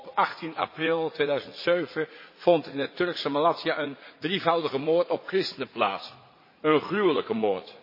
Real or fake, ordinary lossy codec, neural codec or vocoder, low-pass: fake; MP3, 24 kbps; vocoder, 44.1 kHz, 80 mel bands, Vocos; 5.4 kHz